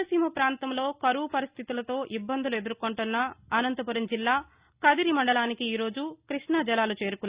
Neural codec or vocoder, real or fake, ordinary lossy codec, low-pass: none; real; Opus, 64 kbps; 3.6 kHz